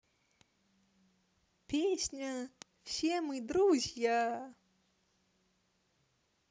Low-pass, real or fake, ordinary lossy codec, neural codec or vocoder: none; real; none; none